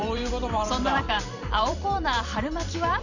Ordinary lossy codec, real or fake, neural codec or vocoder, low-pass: none; fake; vocoder, 44.1 kHz, 128 mel bands every 512 samples, BigVGAN v2; 7.2 kHz